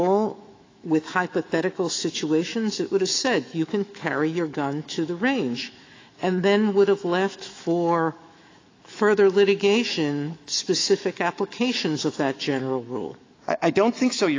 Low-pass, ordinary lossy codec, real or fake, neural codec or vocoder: 7.2 kHz; AAC, 32 kbps; fake; autoencoder, 48 kHz, 128 numbers a frame, DAC-VAE, trained on Japanese speech